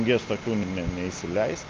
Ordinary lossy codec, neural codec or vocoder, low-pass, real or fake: Opus, 24 kbps; none; 7.2 kHz; real